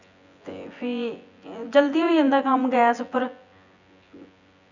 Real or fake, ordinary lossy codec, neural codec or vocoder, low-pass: fake; none; vocoder, 24 kHz, 100 mel bands, Vocos; 7.2 kHz